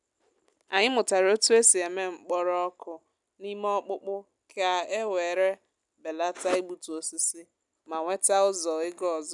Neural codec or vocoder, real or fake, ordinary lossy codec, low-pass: none; real; none; 10.8 kHz